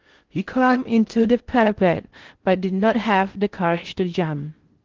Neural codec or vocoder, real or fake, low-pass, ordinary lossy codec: codec, 16 kHz in and 24 kHz out, 0.6 kbps, FocalCodec, streaming, 4096 codes; fake; 7.2 kHz; Opus, 16 kbps